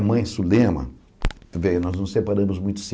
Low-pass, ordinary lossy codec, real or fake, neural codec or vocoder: none; none; real; none